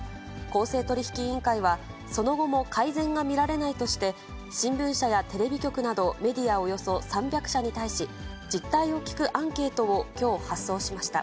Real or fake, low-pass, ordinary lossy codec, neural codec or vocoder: real; none; none; none